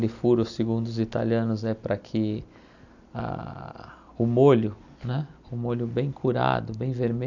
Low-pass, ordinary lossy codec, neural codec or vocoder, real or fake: 7.2 kHz; none; none; real